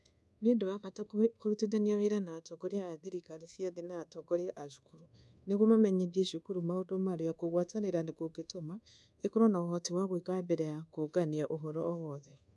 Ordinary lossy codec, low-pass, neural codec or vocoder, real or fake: none; none; codec, 24 kHz, 1.2 kbps, DualCodec; fake